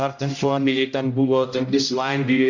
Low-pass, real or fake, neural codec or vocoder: 7.2 kHz; fake; codec, 16 kHz, 0.5 kbps, X-Codec, HuBERT features, trained on general audio